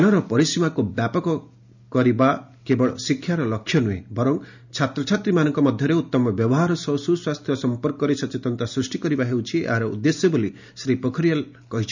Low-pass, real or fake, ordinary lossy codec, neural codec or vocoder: 7.2 kHz; real; none; none